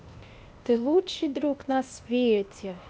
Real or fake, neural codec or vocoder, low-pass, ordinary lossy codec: fake; codec, 16 kHz, 0.8 kbps, ZipCodec; none; none